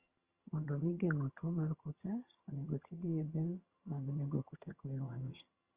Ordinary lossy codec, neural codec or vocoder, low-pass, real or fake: Opus, 32 kbps; vocoder, 22.05 kHz, 80 mel bands, HiFi-GAN; 3.6 kHz; fake